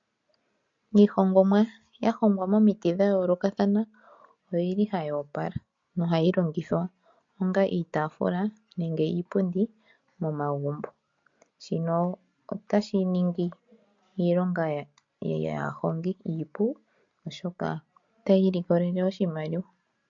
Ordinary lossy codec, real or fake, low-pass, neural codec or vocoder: MP3, 48 kbps; real; 7.2 kHz; none